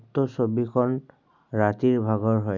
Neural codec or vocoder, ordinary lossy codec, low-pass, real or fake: none; none; 7.2 kHz; real